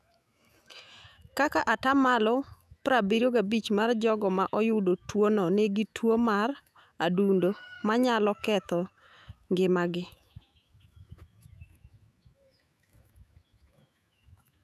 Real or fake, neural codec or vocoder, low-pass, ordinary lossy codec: fake; autoencoder, 48 kHz, 128 numbers a frame, DAC-VAE, trained on Japanese speech; 14.4 kHz; none